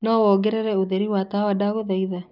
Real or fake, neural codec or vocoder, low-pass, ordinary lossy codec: real; none; 5.4 kHz; none